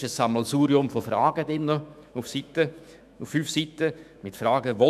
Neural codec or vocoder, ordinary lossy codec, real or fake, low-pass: autoencoder, 48 kHz, 128 numbers a frame, DAC-VAE, trained on Japanese speech; none; fake; 14.4 kHz